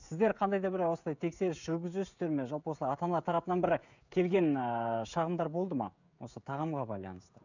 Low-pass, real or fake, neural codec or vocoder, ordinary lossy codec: 7.2 kHz; fake; codec, 16 kHz, 16 kbps, FreqCodec, smaller model; none